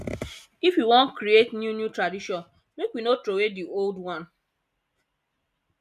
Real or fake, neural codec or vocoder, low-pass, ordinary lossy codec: real; none; 14.4 kHz; none